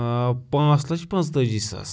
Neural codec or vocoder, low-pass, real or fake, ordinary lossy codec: none; none; real; none